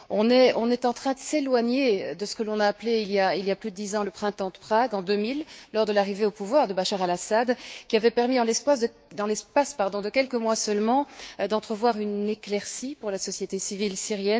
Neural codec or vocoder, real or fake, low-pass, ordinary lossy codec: codec, 16 kHz, 6 kbps, DAC; fake; none; none